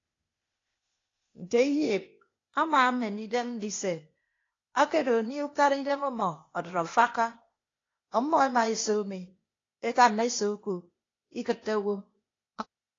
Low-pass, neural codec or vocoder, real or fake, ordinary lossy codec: 7.2 kHz; codec, 16 kHz, 0.8 kbps, ZipCodec; fake; AAC, 32 kbps